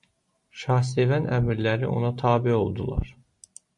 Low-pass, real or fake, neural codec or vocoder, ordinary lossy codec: 10.8 kHz; real; none; MP3, 64 kbps